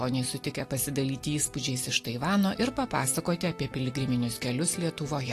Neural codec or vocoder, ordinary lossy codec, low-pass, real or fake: none; AAC, 48 kbps; 14.4 kHz; real